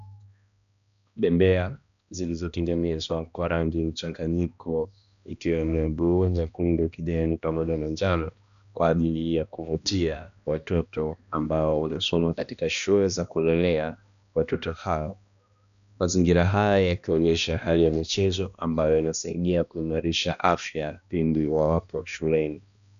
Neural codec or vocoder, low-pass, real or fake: codec, 16 kHz, 1 kbps, X-Codec, HuBERT features, trained on balanced general audio; 7.2 kHz; fake